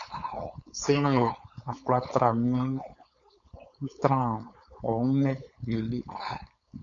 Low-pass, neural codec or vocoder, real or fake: 7.2 kHz; codec, 16 kHz, 4.8 kbps, FACodec; fake